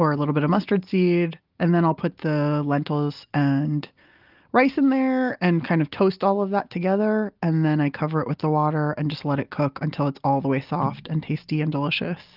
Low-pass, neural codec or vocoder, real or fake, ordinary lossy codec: 5.4 kHz; none; real; Opus, 24 kbps